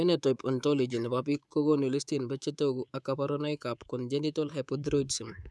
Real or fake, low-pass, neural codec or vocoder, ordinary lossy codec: fake; none; codec, 24 kHz, 3.1 kbps, DualCodec; none